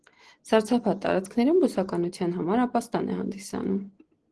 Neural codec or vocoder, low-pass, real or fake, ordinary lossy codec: none; 10.8 kHz; real; Opus, 16 kbps